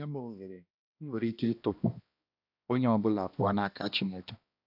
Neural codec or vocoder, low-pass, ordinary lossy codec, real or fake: codec, 16 kHz, 1 kbps, X-Codec, HuBERT features, trained on balanced general audio; 5.4 kHz; AAC, 48 kbps; fake